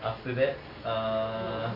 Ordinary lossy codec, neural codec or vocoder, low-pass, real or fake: none; none; 5.4 kHz; real